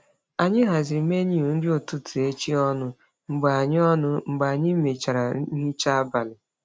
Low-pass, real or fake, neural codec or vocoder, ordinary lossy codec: none; real; none; none